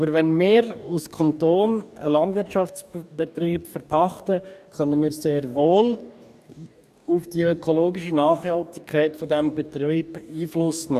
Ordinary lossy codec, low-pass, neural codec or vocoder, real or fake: none; 14.4 kHz; codec, 44.1 kHz, 2.6 kbps, DAC; fake